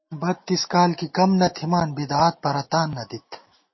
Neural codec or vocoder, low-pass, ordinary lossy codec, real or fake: none; 7.2 kHz; MP3, 24 kbps; real